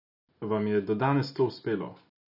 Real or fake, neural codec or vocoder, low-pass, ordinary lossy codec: real; none; 5.4 kHz; none